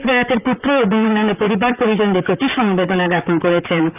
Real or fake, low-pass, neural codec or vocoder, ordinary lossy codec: fake; 3.6 kHz; vocoder, 44.1 kHz, 128 mel bands, Pupu-Vocoder; none